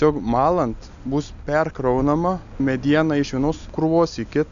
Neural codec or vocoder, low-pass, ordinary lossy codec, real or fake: none; 7.2 kHz; MP3, 96 kbps; real